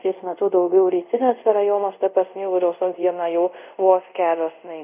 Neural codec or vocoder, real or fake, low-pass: codec, 24 kHz, 0.5 kbps, DualCodec; fake; 3.6 kHz